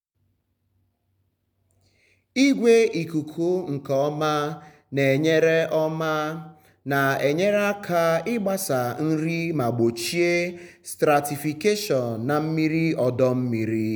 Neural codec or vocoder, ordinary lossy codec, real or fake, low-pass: none; none; real; none